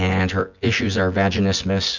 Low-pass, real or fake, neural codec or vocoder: 7.2 kHz; fake; vocoder, 24 kHz, 100 mel bands, Vocos